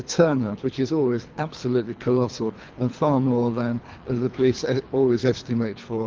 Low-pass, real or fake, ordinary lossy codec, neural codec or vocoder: 7.2 kHz; fake; Opus, 32 kbps; codec, 24 kHz, 3 kbps, HILCodec